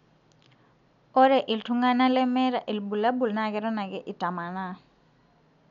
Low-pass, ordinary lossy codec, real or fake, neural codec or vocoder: 7.2 kHz; none; real; none